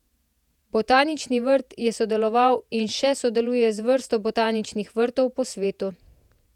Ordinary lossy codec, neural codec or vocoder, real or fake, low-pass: none; vocoder, 48 kHz, 128 mel bands, Vocos; fake; 19.8 kHz